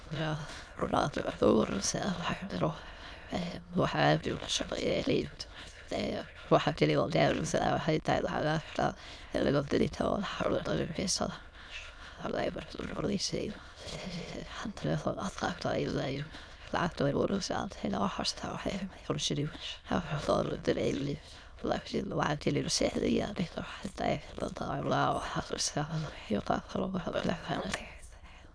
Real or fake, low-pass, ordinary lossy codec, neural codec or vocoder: fake; none; none; autoencoder, 22.05 kHz, a latent of 192 numbers a frame, VITS, trained on many speakers